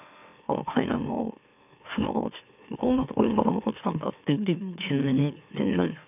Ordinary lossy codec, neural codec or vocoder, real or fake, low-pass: none; autoencoder, 44.1 kHz, a latent of 192 numbers a frame, MeloTTS; fake; 3.6 kHz